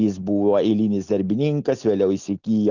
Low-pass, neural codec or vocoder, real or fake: 7.2 kHz; none; real